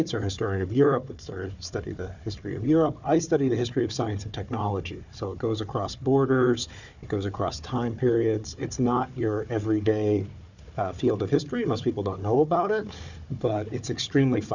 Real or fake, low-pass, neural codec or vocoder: fake; 7.2 kHz; codec, 16 kHz, 4 kbps, FunCodec, trained on Chinese and English, 50 frames a second